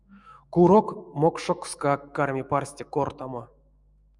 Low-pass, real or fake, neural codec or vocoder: 10.8 kHz; fake; autoencoder, 48 kHz, 128 numbers a frame, DAC-VAE, trained on Japanese speech